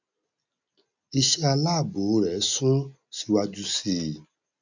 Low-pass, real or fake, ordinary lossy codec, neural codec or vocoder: 7.2 kHz; real; none; none